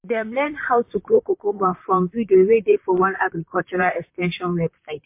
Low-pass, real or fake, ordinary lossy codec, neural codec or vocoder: 3.6 kHz; fake; MP3, 24 kbps; vocoder, 44.1 kHz, 128 mel bands, Pupu-Vocoder